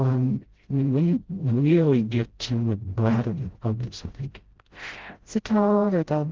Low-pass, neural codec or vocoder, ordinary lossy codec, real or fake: 7.2 kHz; codec, 16 kHz, 0.5 kbps, FreqCodec, smaller model; Opus, 16 kbps; fake